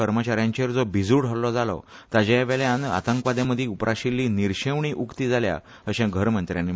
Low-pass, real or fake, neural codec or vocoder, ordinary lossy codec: none; real; none; none